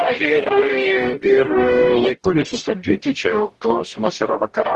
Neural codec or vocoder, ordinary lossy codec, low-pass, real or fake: codec, 44.1 kHz, 0.9 kbps, DAC; Opus, 16 kbps; 10.8 kHz; fake